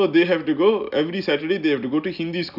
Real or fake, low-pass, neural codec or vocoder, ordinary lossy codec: real; 5.4 kHz; none; none